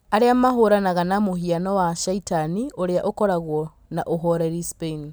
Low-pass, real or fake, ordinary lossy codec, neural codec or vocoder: none; real; none; none